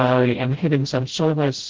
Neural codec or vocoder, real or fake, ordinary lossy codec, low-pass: codec, 16 kHz, 0.5 kbps, FreqCodec, smaller model; fake; Opus, 16 kbps; 7.2 kHz